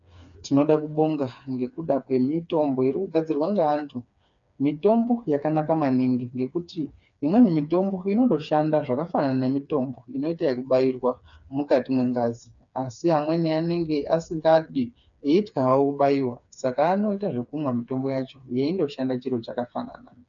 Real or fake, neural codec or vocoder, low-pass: fake; codec, 16 kHz, 4 kbps, FreqCodec, smaller model; 7.2 kHz